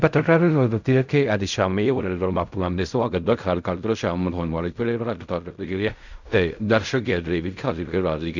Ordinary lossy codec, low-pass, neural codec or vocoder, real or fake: none; 7.2 kHz; codec, 16 kHz in and 24 kHz out, 0.4 kbps, LongCat-Audio-Codec, fine tuned four codebook decoder; fake